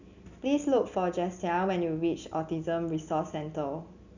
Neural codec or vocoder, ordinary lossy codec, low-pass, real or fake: none; none; 7.2 kHz; real